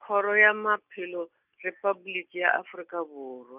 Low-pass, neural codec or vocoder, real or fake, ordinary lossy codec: 3.6 kHz; none; real; none